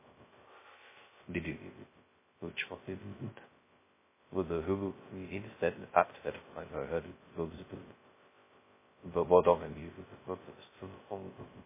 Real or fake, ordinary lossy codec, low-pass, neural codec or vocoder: fake; MP3, 16 kbps; 3.6 kHz; codec, 16 kHz, 0.2 kbps, FocalCodec